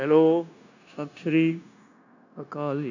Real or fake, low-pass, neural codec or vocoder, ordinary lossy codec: fake; 7.2 kHz; codec, 16 kHz, 0.9 kbps, LongCat-Audio-Codec; none